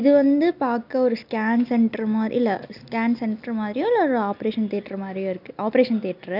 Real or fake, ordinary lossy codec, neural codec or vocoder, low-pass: real; none; none; 5.4 kHz